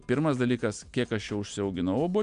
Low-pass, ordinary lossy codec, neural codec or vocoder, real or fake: 9.9 kHz; MP3, 96 kbps; none; real